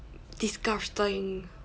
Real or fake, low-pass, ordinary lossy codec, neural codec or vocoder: real; none; none; none